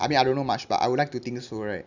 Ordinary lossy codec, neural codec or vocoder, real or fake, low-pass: none; none; real; 7.2 kHz